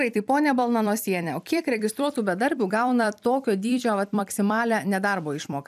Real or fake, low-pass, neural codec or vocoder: fake; 14.4 kHz; vocoder, 44.1 kHz, 128 mel bands every 256 samples, BigVGAN v2